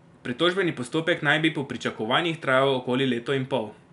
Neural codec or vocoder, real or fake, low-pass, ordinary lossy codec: none; real; 10.8 kHz; none